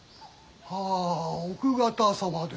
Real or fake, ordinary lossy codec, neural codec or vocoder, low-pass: real; none; none; none